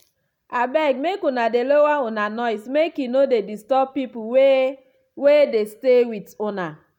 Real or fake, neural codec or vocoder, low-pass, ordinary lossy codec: real; none; 19.8 kHz; none